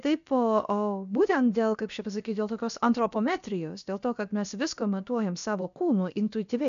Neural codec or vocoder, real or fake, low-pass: codec, 16 kHz, about 1 kbps, DyCAST, with the encoder's durations; fake; 7.2 kHz